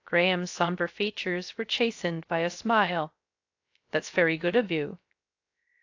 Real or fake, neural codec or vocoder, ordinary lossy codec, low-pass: fake; codec, 16 kHz, 0.3 kbps, FocalCodec; AAC, 48 kbps; 7.2 kHz